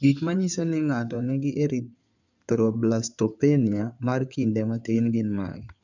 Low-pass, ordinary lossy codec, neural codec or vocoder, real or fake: 7.2 kHz; none; codec, 16 kHz in and 24 kHz out, 2.2 kbps, FireRedTTS-2 codec; fake